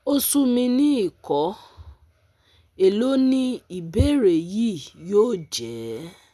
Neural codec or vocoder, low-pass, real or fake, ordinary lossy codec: none; none; real; none